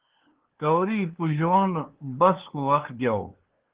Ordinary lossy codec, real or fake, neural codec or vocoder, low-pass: Opus, 16 kbps; fake; codec, 16 kHz, 2 kbps, FunCodec, trained on LibriTTS, 25 frames a second; 3.6 kHz